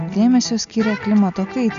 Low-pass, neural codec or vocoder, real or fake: 7.2 kHz; none; real